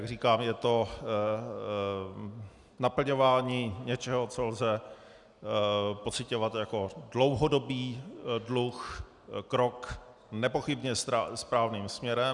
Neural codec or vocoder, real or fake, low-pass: none; real; 10.8 kHz